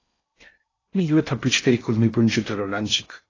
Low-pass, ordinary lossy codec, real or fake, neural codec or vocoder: 7.2 kHz; AAC, 32 kbps; fake; codec, 16 kHz in and 24 kHz out, 0.6 kbps, FocalCodec, streaming, 4096 codes